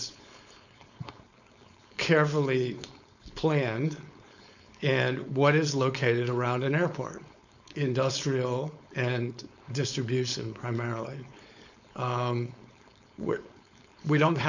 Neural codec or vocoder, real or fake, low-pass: codec, 16 kHz, 4.8 kbps, FACodec; fake; 7.2 kHz